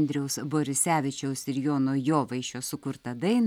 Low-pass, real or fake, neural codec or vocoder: 19.8 kHz; real; none